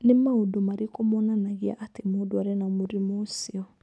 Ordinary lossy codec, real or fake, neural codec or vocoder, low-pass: none; real; none; none